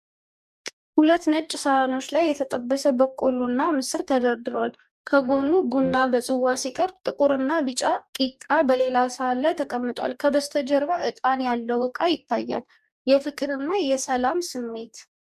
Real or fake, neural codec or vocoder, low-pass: fake; codec, 44.1 kHz, 2.6 kbps, DAC; 14.4 kHz